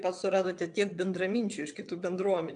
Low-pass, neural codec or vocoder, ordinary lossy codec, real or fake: 9.9 kHz; vocoder, 22.05 kHz, 80 mel bands, WaveNeXt; AAC, 64 kbps; fake